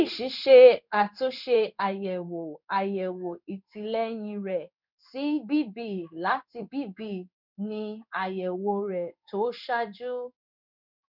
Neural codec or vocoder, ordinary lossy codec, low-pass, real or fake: codec, 16 kHz in and 24 kHz out, 1 kbps, XY-Tokenizer; none; 5.4 kHz; fake